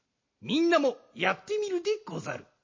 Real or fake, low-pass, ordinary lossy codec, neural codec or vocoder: real; 7.2 kHz; MP3, 32 kbps; none